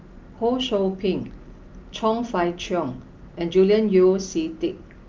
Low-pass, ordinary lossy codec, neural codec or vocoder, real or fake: 7.2 kHz; Opus, 24 kbps; none; real